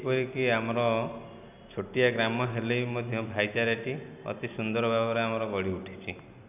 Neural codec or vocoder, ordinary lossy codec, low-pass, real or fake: none; none; 3.6 kHz; real